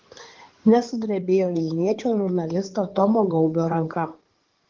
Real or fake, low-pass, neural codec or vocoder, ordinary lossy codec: fake; 7.2 kHz; codec, 16 kHz in and 24 kHz out, 2.2 kbps, FireRedTTS-2 codec; Opus, 16 kbps